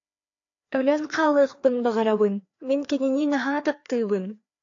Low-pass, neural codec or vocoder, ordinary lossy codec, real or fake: 7.2 kHz; codec, 16 kHz, 2 kbps, FreqCodec, larger model; AAC, 48 kbps; fake